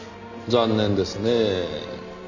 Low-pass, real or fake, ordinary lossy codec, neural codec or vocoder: 7.2 kHz; real; none; none